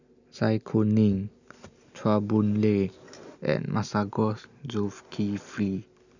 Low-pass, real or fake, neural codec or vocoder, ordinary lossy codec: 7.2 kHz; real; none; none